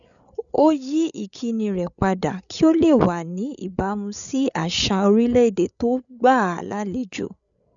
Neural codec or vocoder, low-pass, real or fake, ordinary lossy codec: codec, 16 kHz, 8 kbps, FreqCodec, larger model; 7.2 kHz; fake; none